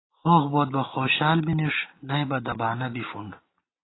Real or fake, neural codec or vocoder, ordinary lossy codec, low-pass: real; none; AAC, 16 kbps; 7.2 kHz